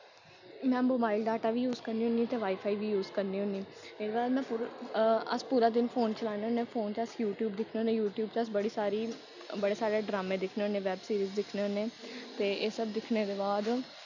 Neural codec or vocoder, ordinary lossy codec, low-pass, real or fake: none; none; 7.2 kHz; real